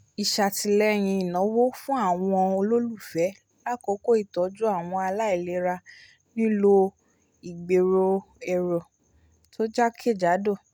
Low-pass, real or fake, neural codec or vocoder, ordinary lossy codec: none; real; none; none